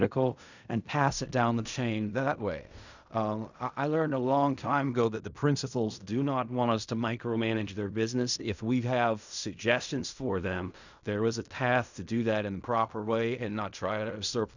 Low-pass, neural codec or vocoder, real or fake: 7.2 kHz; codec, 16 kHz in and 24 kHz out, 0.4 kbps, LongCat-Audio-Codec, fine tuned four codebook decoder; fake